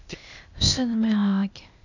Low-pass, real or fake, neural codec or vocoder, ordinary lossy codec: 7.2 kHz; fake; codec, 16 kHz, 0.8 kbps, ZipCodec; none